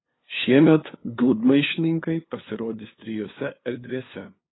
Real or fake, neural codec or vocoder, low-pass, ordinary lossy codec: fake; codec, 16 kHz, 2 kbps, FunCodec, trained on LibriTTS, 25 frames a second; 7.2 kHz; AAC, 16 kbps